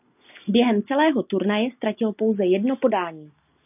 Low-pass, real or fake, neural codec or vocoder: 3.6 kHz; real; none